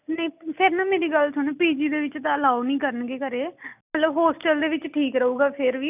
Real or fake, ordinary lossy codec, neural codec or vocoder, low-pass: real; none; none; 3.6 kHz